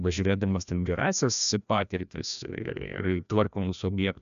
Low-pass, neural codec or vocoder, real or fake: 7.2 kHz; codec, 16 kHz, 1 kbps, FreqCodec, larger model; fake